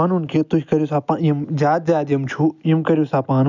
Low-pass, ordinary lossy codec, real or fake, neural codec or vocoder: 7.2 kHz; none; real; none